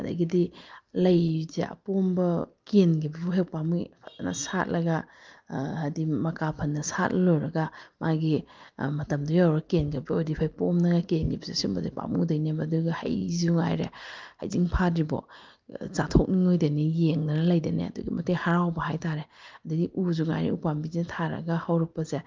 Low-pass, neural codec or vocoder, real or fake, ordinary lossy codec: 7.2 kHz; none; real; Opus, 32 kbps